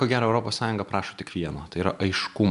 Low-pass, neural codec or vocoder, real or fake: 10.8 kHz; none; real